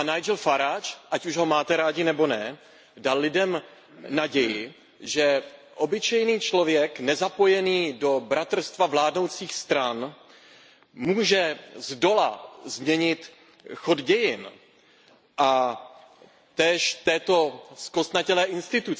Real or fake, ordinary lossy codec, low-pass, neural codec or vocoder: real; none; none; none